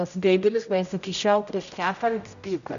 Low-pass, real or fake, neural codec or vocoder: 7.2 kHz; fake; codec, 16 kHz, 0.5 kbps, X-Codec, HuBERT features, trained on general audio